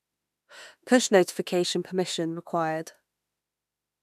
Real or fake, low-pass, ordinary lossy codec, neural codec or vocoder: fake; 14.4 kHz; none; autoencoder, 48 kHz, 32 numbers a frame, DAC-VAE, trained on Japanese speech